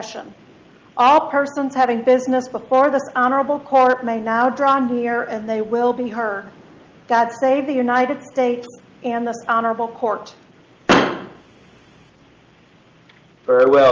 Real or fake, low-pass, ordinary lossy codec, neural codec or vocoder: real; 7.2 kHz; Opus, 24 kbps; none